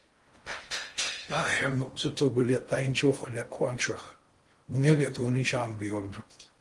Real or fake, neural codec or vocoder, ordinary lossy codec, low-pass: fake; codec, 16 kHz in and 24 kHz out, 0.6 kbps, FocalCodec, streaming, 4096 codes; Opus, 24 kbps; 10.8 kHz